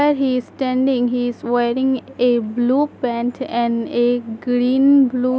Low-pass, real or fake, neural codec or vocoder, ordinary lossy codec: none; real; none; none